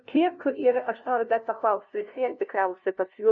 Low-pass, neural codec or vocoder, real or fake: 7.2 kHz; codec, 16 kHz, 0.5 kbps, FunCodec, trained on LibriTTS, 25 frames a second; fake